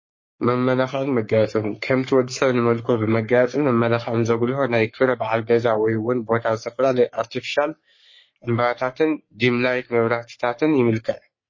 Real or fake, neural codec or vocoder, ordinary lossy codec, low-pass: fake; codec, 44.1 kHz, 3.4 kbps, Pupu-Codec; MP3, 32 kbps; 7.2 kHz